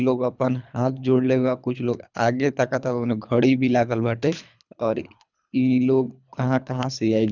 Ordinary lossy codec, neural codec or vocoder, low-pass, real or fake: none; codec, 24 kHz, 3 kbps, HILCodec; 7.2 kHz; fake